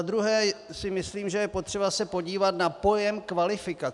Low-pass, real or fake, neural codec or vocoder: 10.8 kHz; real; none